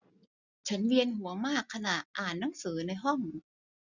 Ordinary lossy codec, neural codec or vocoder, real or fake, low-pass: none; none; real; 7.2 kHz